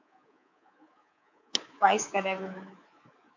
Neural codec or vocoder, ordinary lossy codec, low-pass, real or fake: codec, 24 kHz, 3.1 kbps, DualCodec; MP3, 48 kbps; 7.2 kHz; fake